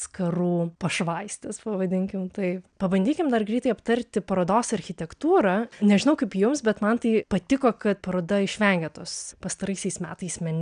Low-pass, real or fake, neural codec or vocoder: 9.9 kHz; real; none